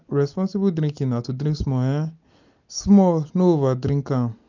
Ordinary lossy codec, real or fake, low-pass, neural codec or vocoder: none; real; 7.2 kHz; none